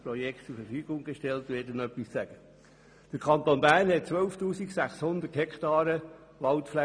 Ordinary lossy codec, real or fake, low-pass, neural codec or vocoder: none; real; 9.9 kHz; none